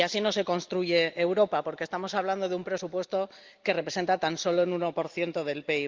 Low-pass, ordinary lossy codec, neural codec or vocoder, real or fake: 7.2 kHz; Opus, 32 kbps; none; real